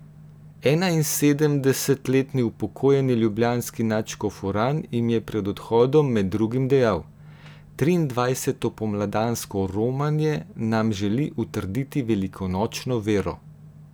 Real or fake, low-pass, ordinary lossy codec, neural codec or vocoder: real; none; none; none